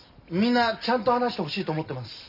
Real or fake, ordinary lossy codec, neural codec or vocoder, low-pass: real; AAC, 48 kbps; none; 5.4 kHz